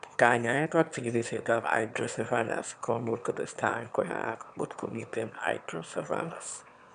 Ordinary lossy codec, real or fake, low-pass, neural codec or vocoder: none; fake; 9.9 kHz; autoencoder, 22.05 kHz, a latent of 192 numbers a frame, VITS, trained on one speaker